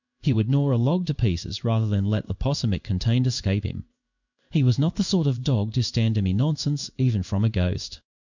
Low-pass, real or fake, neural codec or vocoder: 7.2 kHz; fake; codec, 16 kHz in and 24 kHz out, 1 kbps, XY-Tokenizer